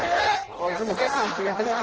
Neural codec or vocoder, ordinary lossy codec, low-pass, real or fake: codec, 16 kHz in and 24 kHz out, 0.6 kbps, FireRedTTS-2 codec; Opus, 16 kbps; 7.2 kHz; fake